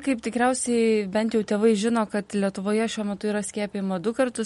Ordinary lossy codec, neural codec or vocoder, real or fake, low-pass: MP3, 48 kbps; none; real; 19.8 kHz